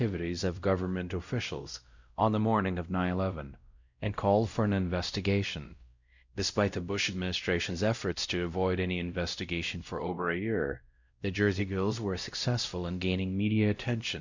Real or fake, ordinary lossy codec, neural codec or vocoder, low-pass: fake; Opus, 64 kbps; codec, 16 kHz, 0.5 kbps, X-Codec, WavLM features, trained on Multilingual LibriSpeech; 7.2 kHz